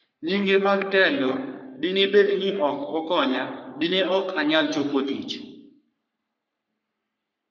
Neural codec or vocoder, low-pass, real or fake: codec, 44.1 kHz, 3.4 kbps, Pupu-Codec; 7.2 kHz; fake